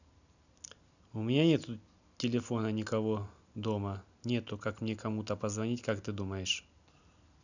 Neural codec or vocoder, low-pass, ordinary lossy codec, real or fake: none; 7.2 kHz; none; real